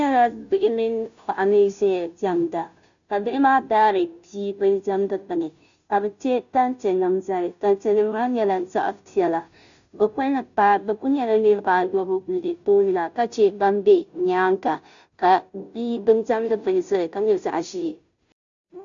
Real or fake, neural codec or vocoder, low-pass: fake; codec, 16 kHz, 0.5 kbps, FunCodec, trained on Chinese and English, 25 frames a second; 7.2 kHz